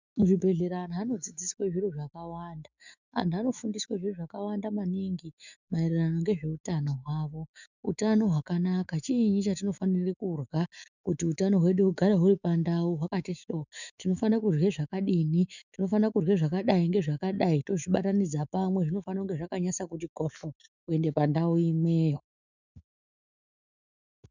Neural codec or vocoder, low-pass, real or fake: autoencoder, 48 kHz, 128 numbers a frame, DAC-VAE, trained on Japanese speech; 7.2 kHz; fake